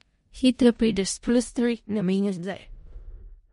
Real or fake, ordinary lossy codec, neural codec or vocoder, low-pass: fake; MP3, 48 kbps; codec, 16 kHz in and 24 kHz out, 0.4 kbps, LongCat-Audio-Codec, four codebook decoder; 10.8 kHz